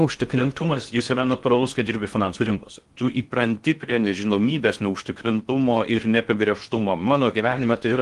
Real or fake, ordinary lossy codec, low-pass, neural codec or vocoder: fake; Opus, 24 kbps; 10.8 kHz; codec, 16 kHz in and 24 kHz out, 0.6 kbps, FocalCodec, streaming, 2048 codes